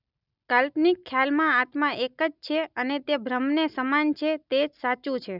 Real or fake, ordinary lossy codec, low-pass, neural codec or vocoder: real; none; 5.4 kHz; none